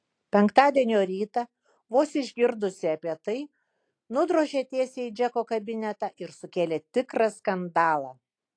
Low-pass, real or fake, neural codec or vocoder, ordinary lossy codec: 9.9 kHz; real; none; AAC, 48 kbps